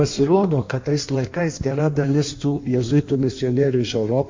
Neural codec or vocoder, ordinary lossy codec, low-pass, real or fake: codec, 16 kHz in and 24 kHz out, 1.1 kbps, FireRedTTS-2 codec; MP3, 48 kbps; 7.2 kHz; fake